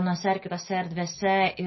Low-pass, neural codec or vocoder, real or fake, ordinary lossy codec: 7.2 kHz; none; real; MP3, 24 kbps